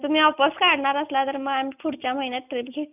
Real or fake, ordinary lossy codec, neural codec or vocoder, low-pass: real; none; none; 3.6 kHz